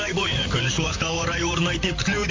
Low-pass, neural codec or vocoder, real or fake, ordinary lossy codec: 7.2 kHz; vocoder, 44.1 kHz, 128 mel bands, Pupu-Vocoder; fake; MP3, 48 kbps